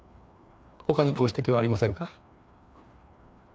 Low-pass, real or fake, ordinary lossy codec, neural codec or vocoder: none; fake; none; codec, 16 kHz, 2 kbps, FreqCodec, larger model